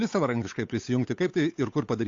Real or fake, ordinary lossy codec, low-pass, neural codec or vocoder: fake; AAC, 48 kbps; 7.2 kHz; codec, 16 kHz, 8 kbps, FunCodec, trained on Chinese and English, 25 frames a second